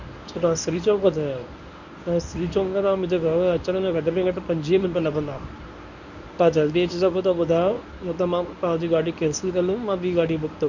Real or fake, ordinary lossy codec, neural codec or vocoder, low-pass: fake; none; codec, 24 kHz, 0.9 kbps, WavTokenizer, medium speech release version 1; 7.2 kHz